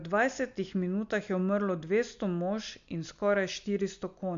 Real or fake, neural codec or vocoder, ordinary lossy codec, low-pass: real; none; MP3, 64 kbps; 7.2 kHz